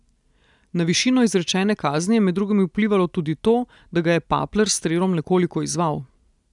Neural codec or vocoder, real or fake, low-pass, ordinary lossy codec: none; real; 10.8 kHz; none